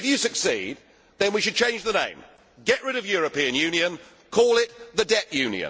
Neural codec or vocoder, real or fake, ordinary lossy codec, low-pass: none; real; none; none